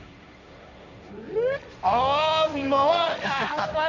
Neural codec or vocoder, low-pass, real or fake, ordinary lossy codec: codec, 16 kHz, 1.1 kbps, Voila-Tokenizer; 7.2 kHz; fake; none